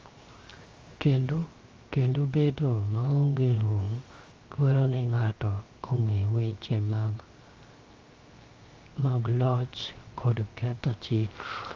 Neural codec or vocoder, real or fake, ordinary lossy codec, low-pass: codec, 16 kHz, 0.7 kbps, FocalCodec; fake; Opus, 32 kbps; 7.2 kHz